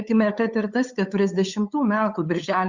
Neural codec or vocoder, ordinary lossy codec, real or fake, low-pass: codec, 16 kHz, 8 kbps, FunCodec, trained on LibriTTS, 25 frames a second; Opus, 64 kbps; fake; 7.2 kHz